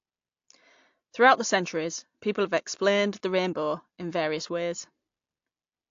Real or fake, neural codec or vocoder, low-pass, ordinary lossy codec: real; none; 7.2 kHz; AAC, 48 kbps